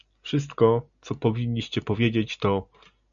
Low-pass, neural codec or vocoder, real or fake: 7.2 kHz; none; real